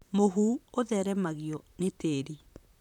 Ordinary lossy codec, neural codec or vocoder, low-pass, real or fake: none; vocoder, 44.1 kHz, 128 mel bands, Pupu-Vocoder; 19.8 kHz; fake